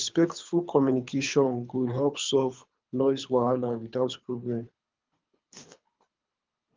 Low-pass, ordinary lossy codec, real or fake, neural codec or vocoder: 7.2 kHz; Opus, 24 kbps; fake; codec, 24 kHz, 3 kbps, HILCodec